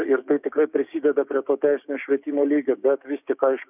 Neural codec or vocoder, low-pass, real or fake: codec, 16 kHz, 6 kbps, DAC; 3.6 kHz; fake